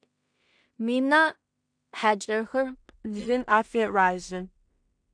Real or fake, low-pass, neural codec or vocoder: fake; 9.9 kHz; codec, 16 kHz in and 24 kHz out, 0.4 kbps, LongCat-Audio-Codec, two codebook decoder